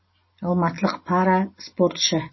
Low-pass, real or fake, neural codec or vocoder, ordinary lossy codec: 7.2 kHz; real; none; MP3, 24 kbps